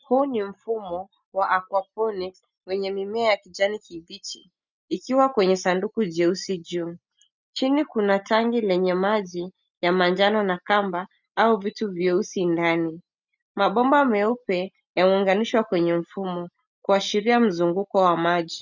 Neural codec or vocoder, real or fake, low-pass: none; real; 7.2 kHz